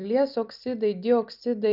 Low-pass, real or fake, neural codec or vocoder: 5.4 kHz; real; none